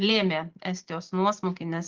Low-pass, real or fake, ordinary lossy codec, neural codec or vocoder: 7.2 kHz; real; Opus, 16 kbps; none